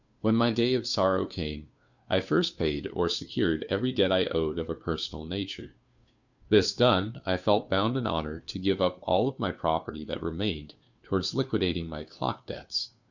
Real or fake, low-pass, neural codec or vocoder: fake; 7.2 kHz; codec, 16 kHz, 2 kbps, FunCodec, trained on Chinese and English, 25 frames a second